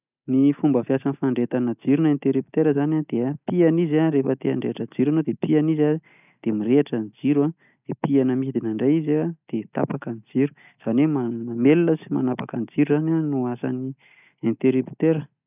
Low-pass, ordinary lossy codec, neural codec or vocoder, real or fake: 3.6 kHz; none; none; real